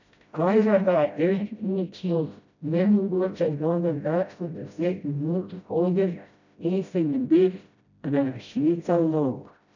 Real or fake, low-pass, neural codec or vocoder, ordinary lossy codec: fake; 7.2 kHz; codec, 16 kHz, 0.5 kbps, FreqCodec, smaller model; none